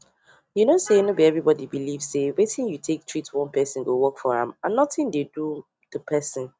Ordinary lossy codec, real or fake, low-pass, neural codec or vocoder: none; real; none; none